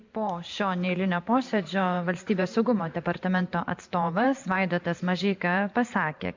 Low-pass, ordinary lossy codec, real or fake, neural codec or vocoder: 7.2 kHz; MP3, 64 kbps; fake; vocoder, 44.1 kHz, 128 mel bands every 512 samples, BigVGAN v2